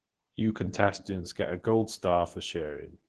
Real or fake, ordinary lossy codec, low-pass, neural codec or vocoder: fake; Opus, 16 kbps; 10.8 kHz; codec, 24 kHz, 0.9 kbps, WavTokenizer, medium speech release version 2